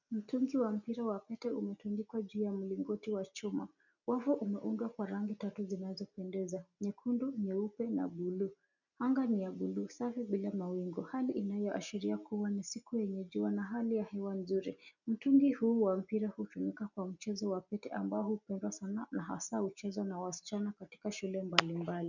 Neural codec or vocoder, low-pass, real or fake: none; 7.2 kHz; real